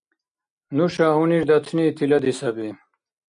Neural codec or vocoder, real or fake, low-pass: none; real; 9.9 kHz